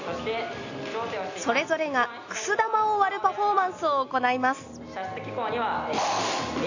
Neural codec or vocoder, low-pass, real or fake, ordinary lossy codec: none; 7.2 kHz; real; AAC, 48 kbps